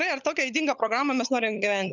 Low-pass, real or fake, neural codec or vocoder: 7.2 kHz; fake; codec, 16 kHz, 16 kbps, FunCodec, trained on LibriTTS, 50 frames a second